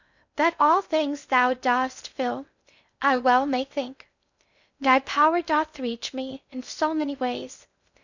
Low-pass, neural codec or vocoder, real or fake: 7.2 kHz; codec, 16 kHz in and 24 kHz out, 0.6 kbps, FocalCodec, streaming, 2048 codes; fake